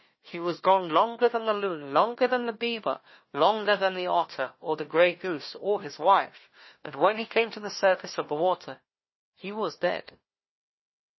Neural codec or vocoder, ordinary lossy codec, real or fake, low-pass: codec, 16 kHz, 1 kbps, FunCodec, trained on Chinese and English, 50 frames a second; MP3, 24 kbps; fake; 7.2 kHz